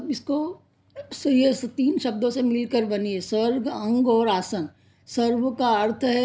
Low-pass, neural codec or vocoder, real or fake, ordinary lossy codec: none; none; real; none